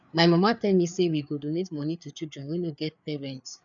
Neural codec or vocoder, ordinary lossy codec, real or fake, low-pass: codec, 16 kHz, 4 kbps, FreqCodec, larger model; none; fake; 7.2 kHz